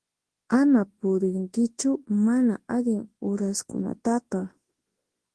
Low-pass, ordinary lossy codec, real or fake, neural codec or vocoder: 10.8 kHz; Opus, 16 kbps; fake; codec, 24 kHz, 0.9 kbps, WavTokenizer, large speech release